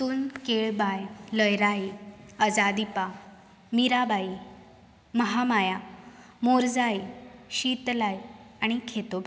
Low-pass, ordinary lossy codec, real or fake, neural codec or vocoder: none; none; real; none